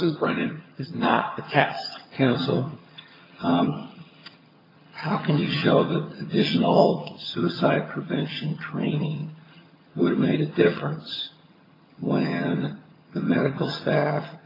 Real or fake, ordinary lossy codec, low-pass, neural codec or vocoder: fake; AAC, 24 kbps; 5.4 kHz; vocoder, 22.05 kHz, 80 mel bands, HiFi-GAN